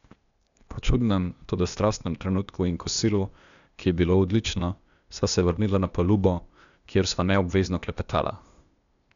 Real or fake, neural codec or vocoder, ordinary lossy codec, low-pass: fake; codec, 16 kHz, 0.8 kbps, ZipCodec; none; 7.2 kHz